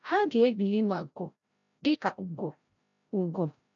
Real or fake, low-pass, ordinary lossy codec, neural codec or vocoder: fake; 7.2 kHz; MP3, 96 kbps; codec, 16 kHz, 0.5 kbps, FreqCodec, larger model